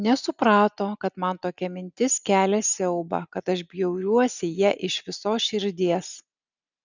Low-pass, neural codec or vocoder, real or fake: 7.2 kHz; none; real